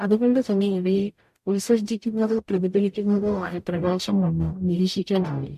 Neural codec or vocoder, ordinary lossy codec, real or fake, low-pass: codec, 44.1 kHz, 0.9 kbps, DAC; MP3, 64 kbps; fake; 14.4 kHz